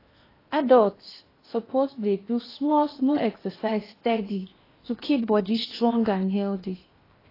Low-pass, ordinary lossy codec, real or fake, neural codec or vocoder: 5.4 kHz; AAC, 24 kbps; fake; codec, 16 kHz in and 24 kHz out, 0.8 kbps, FocalCodec, streaming, 65536 codes